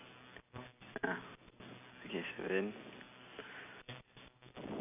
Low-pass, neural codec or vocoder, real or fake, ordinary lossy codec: 3.6 kHz; none; real; none